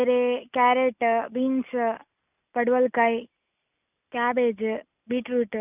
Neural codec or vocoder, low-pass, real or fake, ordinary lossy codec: none; 3.6 kHz; real; none